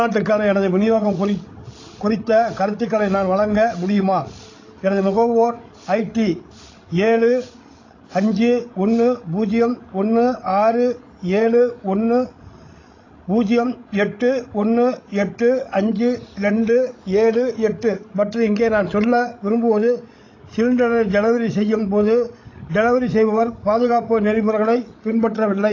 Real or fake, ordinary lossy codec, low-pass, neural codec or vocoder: fake; AAC, 32 kbps; 7.2 kHz; codec, 16 kHz, 16 kbps, FreqCodec, larger model